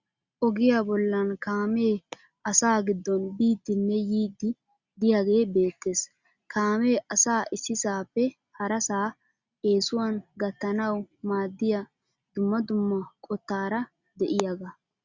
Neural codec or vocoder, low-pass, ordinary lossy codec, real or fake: none; 7.2 kHz; Opus, 64 kbps; real